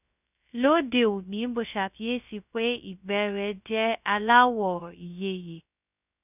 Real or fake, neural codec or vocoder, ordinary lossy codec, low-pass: fake; codec, 16 kHz, 0.2 kbps, FocalCodec; none; 3.6 kHz